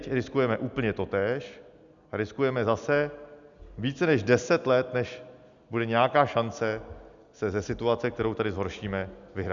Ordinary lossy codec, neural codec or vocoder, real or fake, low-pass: MP3, 96 kbps; none; real; 7.2 kHz